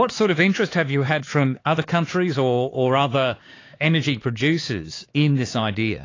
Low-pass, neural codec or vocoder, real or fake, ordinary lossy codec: 7.2 kHz; codec, 16 kHz, 4 kbps, X-Codec, HuBERT features, trained on LibriSpeech; fake; AAC, 32 kbps